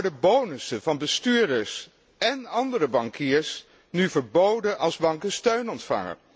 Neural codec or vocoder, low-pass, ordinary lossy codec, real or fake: none; none; none; real